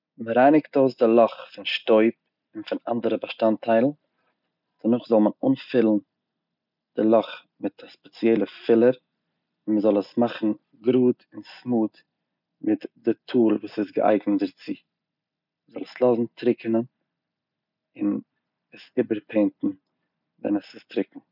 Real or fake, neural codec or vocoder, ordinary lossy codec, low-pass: real; none; none; 5.4 kHz